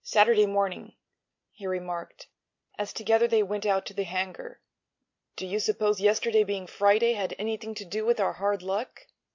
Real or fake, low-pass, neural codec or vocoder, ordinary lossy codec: real; 7.2 kHz; none; MP3, 48 kbps